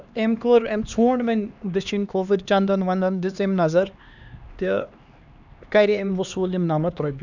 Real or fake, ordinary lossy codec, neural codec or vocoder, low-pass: fake; none; codec, 16 kHz, 2 kbps, X-Codec, HuBERT features, trained on LibriSpeech; 7.2 kHz